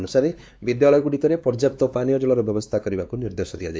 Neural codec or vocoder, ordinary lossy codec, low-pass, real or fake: codec, 16 kHz, 2 kbps, X-Codec, WavLM features, trained on Multilingual LibriSpeech; none; none; fake